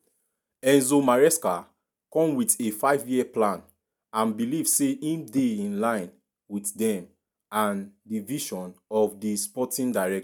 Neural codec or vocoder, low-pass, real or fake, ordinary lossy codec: none; none; real; none